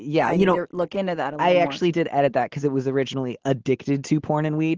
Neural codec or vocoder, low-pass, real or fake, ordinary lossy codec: none; 7.2 kHz; real; Opus, 16 kbps